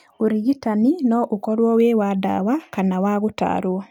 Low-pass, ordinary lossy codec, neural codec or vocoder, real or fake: 19.8 kHz; none; none; real